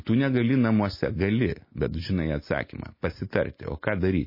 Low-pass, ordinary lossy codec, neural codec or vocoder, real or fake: 5.4 kHz; MP3, 24 kbps; none; real